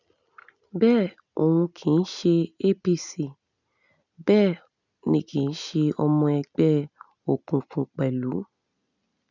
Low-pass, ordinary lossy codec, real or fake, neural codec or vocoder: 7.2 kHz; none; real; none